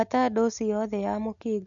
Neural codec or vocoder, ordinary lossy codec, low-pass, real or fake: none; AAC, 64 kbps; 7.2 kHz; real